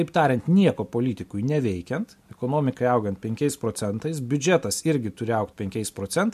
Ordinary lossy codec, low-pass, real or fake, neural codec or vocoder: MP3, 64 kbps; 14.4 kHz; real; none